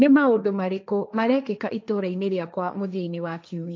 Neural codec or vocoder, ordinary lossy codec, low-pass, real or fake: codec, 16 kHz, 1.1 kbps, Voila-Tokenizer; none; none; fake